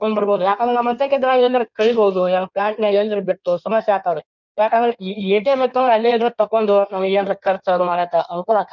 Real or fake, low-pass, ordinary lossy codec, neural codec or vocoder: fake; 7.2 kHz; none; codec, 16 kHz in and 24 kHz out, 1.1 kbps, FireRedTTS-2 codec